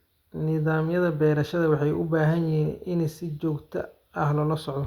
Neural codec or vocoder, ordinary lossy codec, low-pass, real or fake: none; Opus, 64 kbps; 19.8 kHz; real